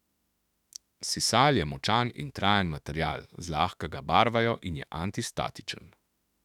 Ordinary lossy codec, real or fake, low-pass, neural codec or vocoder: none; fake; 19.8 kHz; autoencoder, 48 kHz, 32 numbers a frame, DAC-VAE, trained on Japanese speech